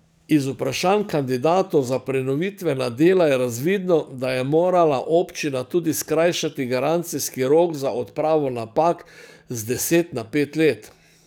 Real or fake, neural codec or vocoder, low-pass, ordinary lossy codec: fake; codec, 44.1 kHz, 7.8 kbps, DAC; none; none